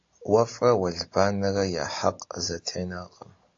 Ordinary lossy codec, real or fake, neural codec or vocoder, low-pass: AAC, 48 kbps; real; none; 7.2 kHz